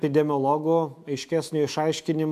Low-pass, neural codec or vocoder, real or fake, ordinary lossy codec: 14.4 kHz; autoencoder, 48 kHz, 128 numbers a frame, DAC-VAE, trained on Japanese speech; fake; AAC, 96 kbps